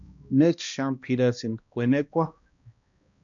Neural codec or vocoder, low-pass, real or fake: codec, 16 kHz, 1 kbps, X-Codec, HuBERT features, trained on balanced general audio; 7.2 kHz; fake